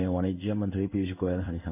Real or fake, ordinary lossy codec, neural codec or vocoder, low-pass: fake; none; codec, 16 kHz in and 24 kHz out, 1 kbps, XY-Tokenizer; 3.6 kHz